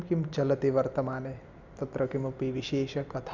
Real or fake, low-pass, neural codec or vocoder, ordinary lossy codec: real; 7.2 kHz; none; none